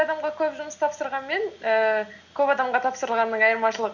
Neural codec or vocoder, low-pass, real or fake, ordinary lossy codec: none; 7.2 kHz; real; none